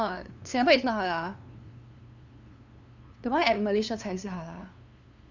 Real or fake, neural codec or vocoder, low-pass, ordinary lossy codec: fake; codec, 16 kHz, 2 kbps, FunCodec, trained on LibriTTS, 25 frames a second; 7.2 kHz; Opus, 64 kbps